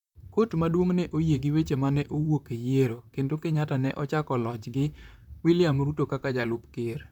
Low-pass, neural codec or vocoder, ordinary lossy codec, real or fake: 19.8 kHz; vocoder, 44.1 kHz, 128 mel bands, Pupu-Vocoder; none; fake